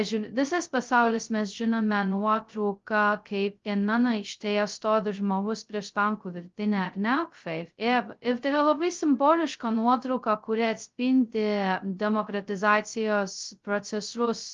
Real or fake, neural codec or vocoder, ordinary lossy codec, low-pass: fake; codec, 16 kHz, 0.2 kbps, FocalCodec; Opus, 32 kbps; 7.2 kHz